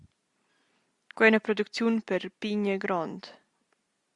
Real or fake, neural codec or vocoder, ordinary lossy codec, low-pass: real; none; Opus, 64 kbps; 10.8 kHz